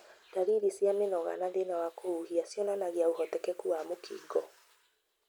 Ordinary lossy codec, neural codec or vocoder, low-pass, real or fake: none; none; none; real